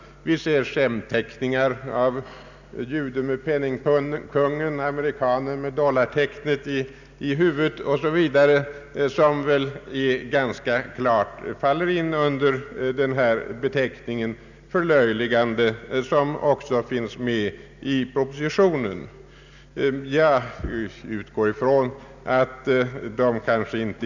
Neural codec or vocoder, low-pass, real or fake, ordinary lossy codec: none; 7.2 kHz; real; none